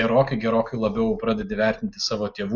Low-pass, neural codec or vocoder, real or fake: 7.2 kHz; none; real